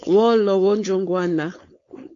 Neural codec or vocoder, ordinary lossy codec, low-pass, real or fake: codec, 16 kHz, 4.8 kbps, FACodec; AAC, 48 kbps; 7.2 kHz; fake